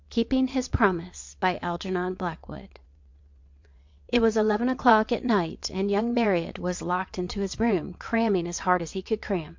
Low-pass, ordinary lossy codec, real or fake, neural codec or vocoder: 7.2 kHz; MP3, 48 kbps; fake; vocoder, 22.05 kHz, 80 mel bands, WaveNeXt